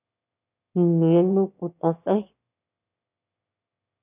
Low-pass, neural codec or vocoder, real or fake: 3.6 kHz; autoencoder, 22.05 kHz, a latent of 192 numbers a frame, VITS, trained on one speaker; fake